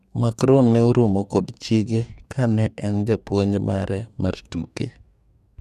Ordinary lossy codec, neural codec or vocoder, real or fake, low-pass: none; codec, 44.1 kHz, 2.6 kbps, DAC; fake; 14.4 kHz